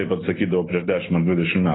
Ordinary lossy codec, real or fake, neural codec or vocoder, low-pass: AAC, 16 kbps; real; none; 7.2 kHz